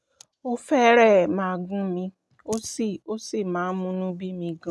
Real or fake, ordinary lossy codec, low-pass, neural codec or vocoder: real; none; none; none